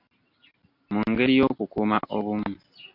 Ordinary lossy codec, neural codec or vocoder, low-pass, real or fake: MP3, 48 kbps; none; 5.4 kHz; real